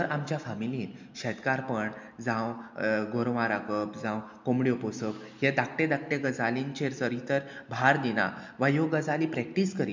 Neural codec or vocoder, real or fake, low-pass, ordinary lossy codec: none; real; 7.2 kHz; MP3, 64 kbps